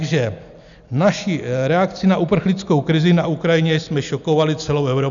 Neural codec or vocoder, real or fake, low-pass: none; real; 7.2 kHz